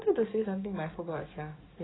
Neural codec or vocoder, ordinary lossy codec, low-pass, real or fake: codec, 44.1 kHz, 7.8 kbps, DAC; AAC, 16 kbps; 7.2 kHz; fake